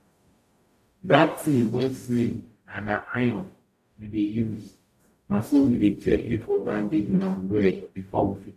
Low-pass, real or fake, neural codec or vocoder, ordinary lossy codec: 14.4 kHz; fake; codec, 44.1 kHz, 0.9 kbps, DAC; AAC, 96 kbps